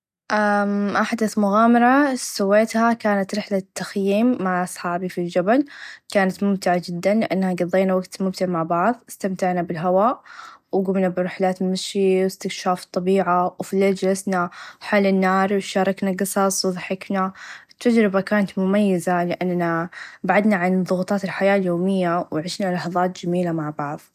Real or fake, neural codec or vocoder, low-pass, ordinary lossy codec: real; none; 14.4 kHz; none